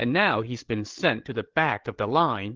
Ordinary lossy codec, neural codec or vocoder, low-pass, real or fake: Opus, 16 kbps; codec, 16 kHz, 8 kbps, FreqCodec, larger model; 7.2 kHz; fake